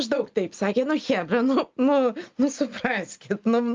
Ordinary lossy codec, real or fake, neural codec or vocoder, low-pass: Opus, 24 kbps; real; none; 7.2 kHz